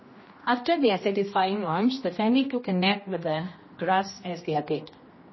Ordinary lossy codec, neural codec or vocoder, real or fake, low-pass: MP3, 24 kbps; codec, 16 kHz, 1 kbps, X-Codec, HuBERT features, trained on general audio; fake; 7.2 kHz